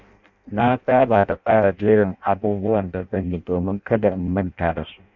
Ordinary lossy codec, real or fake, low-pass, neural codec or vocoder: none; fake; 7.2 kHz; codec, 16 kHz in and 24 kHz out, 0.6 kbps, FireRedTTS-2 codec